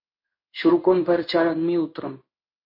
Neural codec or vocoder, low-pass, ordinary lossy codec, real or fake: codec, 16 kHz in and 24 kHz out, 1 kbps, XY-Tokenizer; 5.4 kHz; MP3, 32 kbps; fake